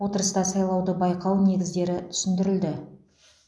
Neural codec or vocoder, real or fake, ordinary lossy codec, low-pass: none; real; none; none